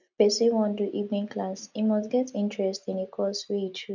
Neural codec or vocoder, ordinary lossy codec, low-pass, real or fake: none; none; 7.2 kHz; real